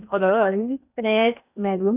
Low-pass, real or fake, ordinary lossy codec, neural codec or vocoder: 3.6 kHz; fake; none; codec, 16 kHz in and 24 kHz out, 0.8 kbps, FocalCodec, streaming, 65536 codes